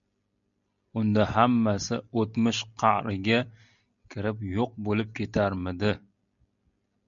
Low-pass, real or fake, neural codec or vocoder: 7.2 kHz; real; none